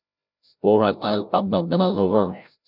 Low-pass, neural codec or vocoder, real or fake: 5.4 kHz; codec, 16 kHz, 0.5 kbps, FreqCodec, larger model; fake